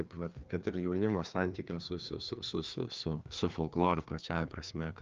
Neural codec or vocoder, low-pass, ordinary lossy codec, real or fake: codec, 16 kHz, 2 kbps, FreqCodec, larger model; 7.2 kHz; Opus, 24 kbps; fake